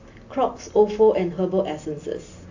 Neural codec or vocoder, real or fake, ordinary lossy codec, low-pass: none; real; AAC, 48 kbps; 7.2 kHz